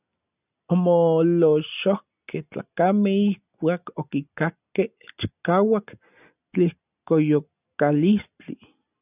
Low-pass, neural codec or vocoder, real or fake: 3.6 kHz; none; real